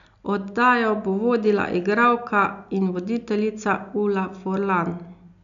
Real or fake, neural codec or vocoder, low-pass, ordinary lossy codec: real; none; 7.2 kHz; none